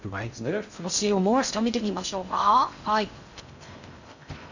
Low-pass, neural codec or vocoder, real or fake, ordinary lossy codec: 7.2 kHz; codec, 16 kHz in and 24 kHz out, 0.6 kbps, FocalCodec, streaming, 2048 codes; fake; none